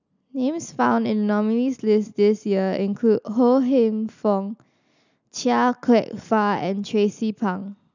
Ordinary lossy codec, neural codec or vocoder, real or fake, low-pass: none; none; real; 7.2 kHz